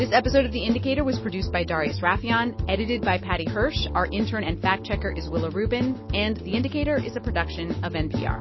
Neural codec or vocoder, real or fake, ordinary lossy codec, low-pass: none; real; MP3, 24 kbps; 7.2 kHz